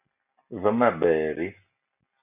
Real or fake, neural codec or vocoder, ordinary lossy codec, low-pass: real; none; AAC, 32 kbps; 3.6 kHz